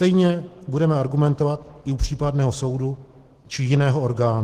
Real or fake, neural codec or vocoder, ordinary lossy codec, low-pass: fake; autoencoder, 48 kHz, 128 numbers a frame, DAC-VAE, trained on Japanese speech; Opus, 16 kbps; 14.4 kHz